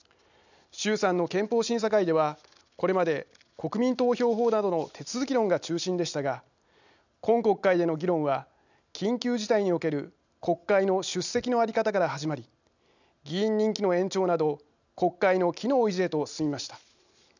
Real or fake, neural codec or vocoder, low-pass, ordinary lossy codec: real; none; 7.2 kHz; none